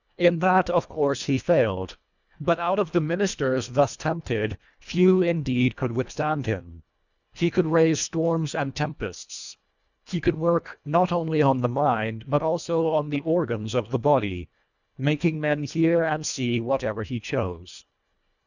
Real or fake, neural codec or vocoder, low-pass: fake; codec, 24 kHz, 1.5 kbps, HILCodec; 7.2 kHz